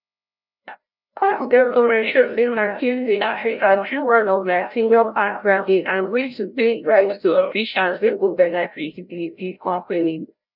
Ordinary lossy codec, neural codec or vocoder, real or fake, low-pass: none; codec, 16 kHz, 0.5 kbps, FreqCodec, larger model; fake; 5.4 kHz